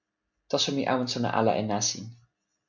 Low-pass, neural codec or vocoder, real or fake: 7.2 kHz; none; real